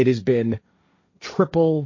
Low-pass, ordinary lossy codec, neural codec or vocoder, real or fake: 7.2 kHz; MP3, 32 kbps; codec, 16 kHz, 1.1 kbps, Voila-Tokenizer; fake